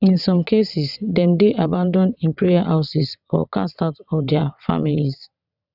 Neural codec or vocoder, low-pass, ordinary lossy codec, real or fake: vocoder, 22.05 kHz, 80 mel bands, WaveNeXt; 5.4 kHz; none; fake